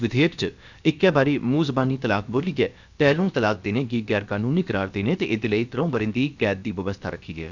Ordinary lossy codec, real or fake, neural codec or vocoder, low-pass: none; fake; codec, 16 kHz, about 1 kbps, DyCAST, with the encoder's durations; 7.2 kHz